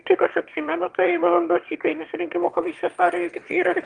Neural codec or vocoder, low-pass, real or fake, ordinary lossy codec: autoencoder, 22.05 kHz, a latent of 192 numbers a frame, VITS, trained on one speaker; 9.9 kHz; fake; Opus, 32 kbps